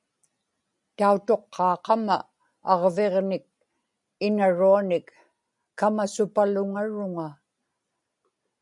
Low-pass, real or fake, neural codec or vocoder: 10.8 kHz; real; none